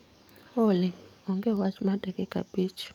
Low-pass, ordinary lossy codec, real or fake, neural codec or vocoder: 19.8 kHz; none; fake; codec, 44.1 kHz, 7.8 kbps, DAC